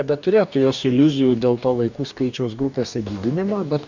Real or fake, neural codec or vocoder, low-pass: fake; codec, 44.1 kHz, 2.6 kbps, DAC; 7.2 kHz